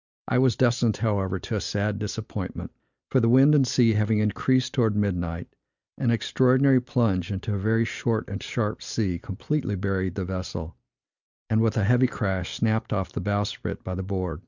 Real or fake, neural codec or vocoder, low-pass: real; none; 7.2 kHz